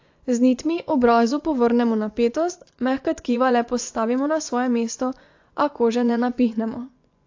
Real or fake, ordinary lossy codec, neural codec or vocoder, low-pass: fake; AAC, 48 kbps; vocoder, 24 kHz, 100 mel bands, Vocos; 7.2 kHz